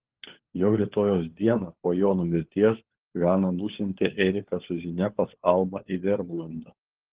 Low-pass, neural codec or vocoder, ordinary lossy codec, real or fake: 3.6 kHz; codec, 16 kHz, 4 kbps, FunCodec, trained on LibriTTS, 50 frames a second; Opus, 16 kbps; fake